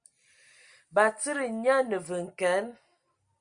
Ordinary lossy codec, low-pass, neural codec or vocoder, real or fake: Opus, 64 kbps; 9.9 kHz; none; real